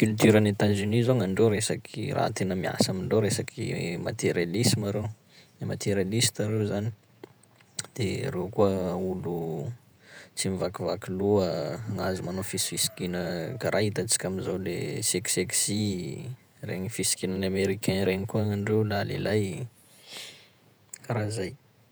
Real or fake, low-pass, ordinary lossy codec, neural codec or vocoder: fake; none; none; vocoder, 48 kHz, 128 mel bands, Vocos